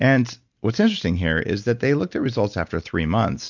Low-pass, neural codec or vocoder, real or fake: 7.2 kHz; none; real